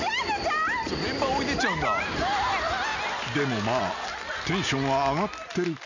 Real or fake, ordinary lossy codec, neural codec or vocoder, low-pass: real; none; none; 7.2 kHz